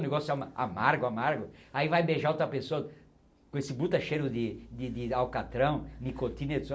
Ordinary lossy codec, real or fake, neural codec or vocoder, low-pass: none; real; none; none